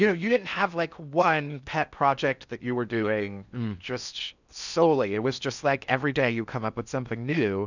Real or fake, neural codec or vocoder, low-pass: fake; codec, 16 kHz in and 24 kHz out, 0.8 kbps, FocalCodec, streaming, 65536 codes; 7.2 kHz